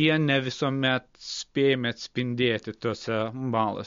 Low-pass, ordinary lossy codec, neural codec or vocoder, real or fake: 7.2 kHz; MP3, 48 kbps; none; real